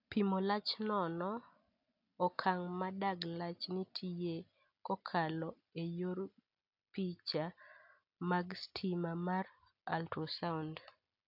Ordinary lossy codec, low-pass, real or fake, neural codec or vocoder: AAC, 48 kbps; 5.4 kHz; real; none